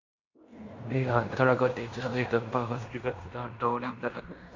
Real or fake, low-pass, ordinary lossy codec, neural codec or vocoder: fake; 7.2 kHz; MP3, 48 kbps; codec, 16 kHz in and 24 kHz out, 0.9 kbps, LongCat-Audio-Codec, fine tuned four codebook decoder